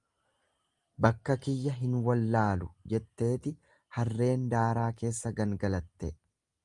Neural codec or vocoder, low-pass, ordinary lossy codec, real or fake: none; 10.8 kHz; Opus, 32 kbps; real